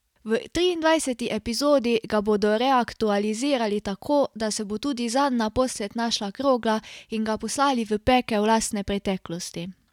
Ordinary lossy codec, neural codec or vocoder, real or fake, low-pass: none; none; real; 19.8 kHz